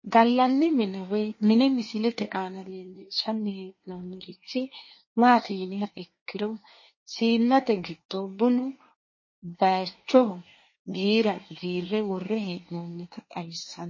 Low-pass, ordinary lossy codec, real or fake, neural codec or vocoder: 7.2 kHz; MP3, 32 kbps; fake; codec, 24 kHz, 1 kbps, SNAC